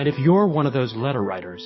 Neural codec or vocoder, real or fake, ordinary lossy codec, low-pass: codec, 44.1 kHz, 7.8 kbps, DAC; fake; MP3, 24 kbps; 7.2 kHz